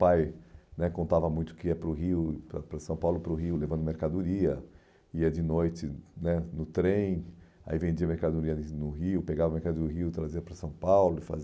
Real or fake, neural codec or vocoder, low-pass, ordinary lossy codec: real; none; none; none